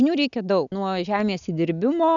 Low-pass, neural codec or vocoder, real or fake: 7.2 kHz; none; real